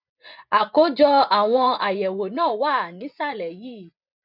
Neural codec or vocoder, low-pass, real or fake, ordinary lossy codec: vocoder, 24 kHz, 100 mel bands, Vocos; 5.4 kHz; fake; none